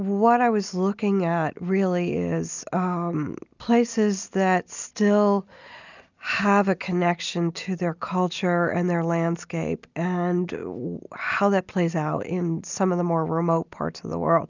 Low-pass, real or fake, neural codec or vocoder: 7.2 kHz; real; none